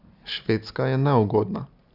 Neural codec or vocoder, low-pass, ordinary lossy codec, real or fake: none; 5.4 kHz; none; real